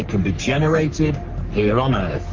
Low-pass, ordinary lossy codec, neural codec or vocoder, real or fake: 7.2 kHz; Opus, 32 kbps; codec, 44.1 kHz, 3.4 kbps, Pupu-Codec; fake